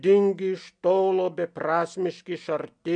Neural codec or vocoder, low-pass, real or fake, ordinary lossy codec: none; 9.9 kHz; real; MP3, 64 kbps